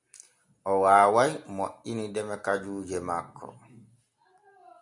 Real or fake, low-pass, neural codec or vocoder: real; 10.8 kHz; none